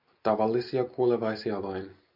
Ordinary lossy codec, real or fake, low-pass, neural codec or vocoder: MP3, 48 kbps; real; 5.4 kHz; none